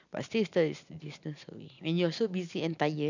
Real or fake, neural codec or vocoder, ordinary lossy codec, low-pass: real; none; none; 7.2 kHz